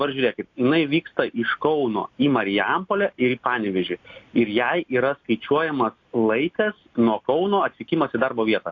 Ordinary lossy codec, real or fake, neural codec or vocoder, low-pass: AAC, 48 kbps; real; none; 7.2 kHz